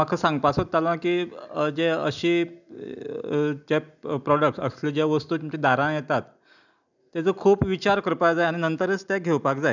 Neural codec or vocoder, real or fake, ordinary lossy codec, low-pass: none; real; none; 7.2 kHz